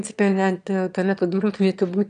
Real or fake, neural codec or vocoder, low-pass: fake; autoencoder, 22.05 kHz, a latent of 192 numbers a frame, VITS, trained on one speaker; 9.9 kHz